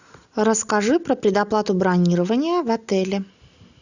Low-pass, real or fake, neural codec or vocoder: 7.2 kHz; real; none